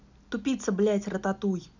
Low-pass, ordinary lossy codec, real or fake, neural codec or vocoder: 7.2 kHz; none; real; none